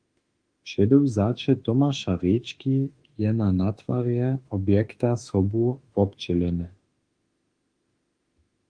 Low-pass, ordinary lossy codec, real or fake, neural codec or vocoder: 9.9 kHz; Opus, 24 kbps; fake; autoencoder, 48 kHz, 32 numbers a frame, DAC-VAE, trained on Japanese speech